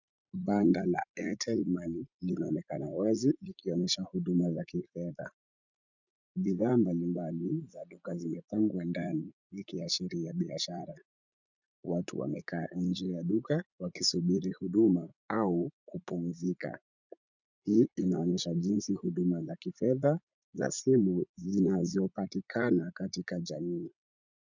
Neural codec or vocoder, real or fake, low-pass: vocoder, 44.1 kHz, 80 mel bands, Vocos; fake; 7.2 kHz